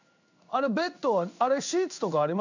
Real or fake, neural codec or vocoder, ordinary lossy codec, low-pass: fake; codec, 16 kHz in and 24 kHz out, 1 kbps, XY-Tokenizer; none; 7.2 kHz